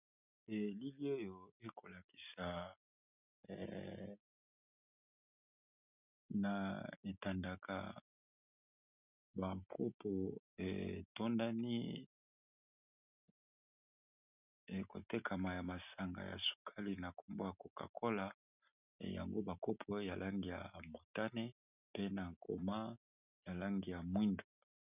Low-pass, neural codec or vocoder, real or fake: 3.6 kHz; none; real